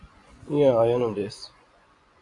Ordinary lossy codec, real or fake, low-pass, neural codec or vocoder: AAC, 48 kbps; fake; 10.8 kHz; vocoder, 24 kHz, 100 mel bands, Vocos